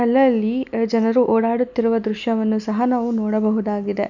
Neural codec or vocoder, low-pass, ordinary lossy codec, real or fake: none; 7.2 kHz; none; real